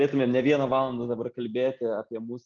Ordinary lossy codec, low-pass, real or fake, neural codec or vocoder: Opus, 24 kbps; 7.2 kHz; real; none